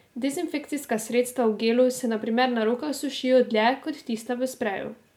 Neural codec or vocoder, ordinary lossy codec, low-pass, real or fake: none; MP3, 96 kbps; 19.8 kHz; real